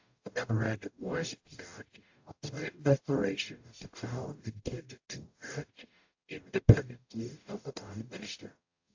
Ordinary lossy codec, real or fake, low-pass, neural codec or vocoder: AAC, 48 kbps; fake; 7.2 kHz; codec, 44.1 kHz, 0.9 kbps, DAC